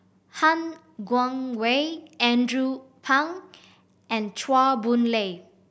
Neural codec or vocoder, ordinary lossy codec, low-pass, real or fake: none; none; none; real